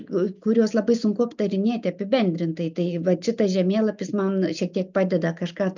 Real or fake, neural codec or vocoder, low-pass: real; none; 7.2 kHz